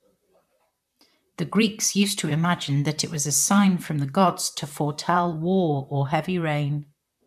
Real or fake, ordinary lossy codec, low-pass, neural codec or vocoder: fake; none; 14.4 kHz; vocoder, 44.1 kHz, 128 mel bands, Pupu-Vocoder